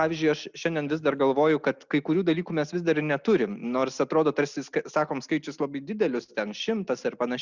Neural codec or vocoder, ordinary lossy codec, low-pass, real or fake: none; Opus, 64 kbps; 7.2 kHz; real